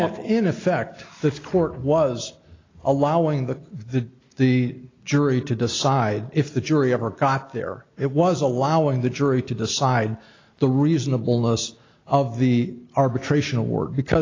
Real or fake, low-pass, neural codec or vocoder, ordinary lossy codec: real; 7.2 kHz; none; AAC, 32 kbps